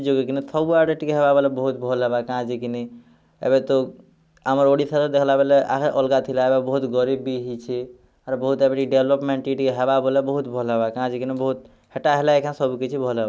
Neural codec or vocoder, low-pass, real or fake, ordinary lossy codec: none; none; real; none